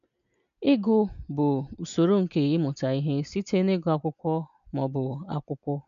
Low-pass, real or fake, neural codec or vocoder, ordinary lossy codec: 7.2 kHz; real; none; none